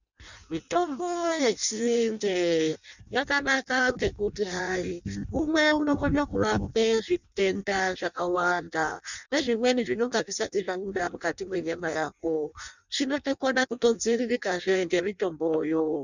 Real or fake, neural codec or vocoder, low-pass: fake; codec, 16 kHz in and 24 kHz out, 0.6 kbps, FireRedTTS-2 codec; 7.2 kHz